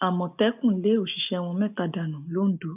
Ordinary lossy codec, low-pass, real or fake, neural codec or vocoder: none; 3.6 kHz; real; none